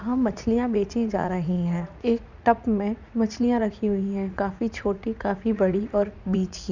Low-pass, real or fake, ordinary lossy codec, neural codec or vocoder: 7.2 kHz; fake; none; vocoder, 44.1 kHz, 80 mel bands, Vocos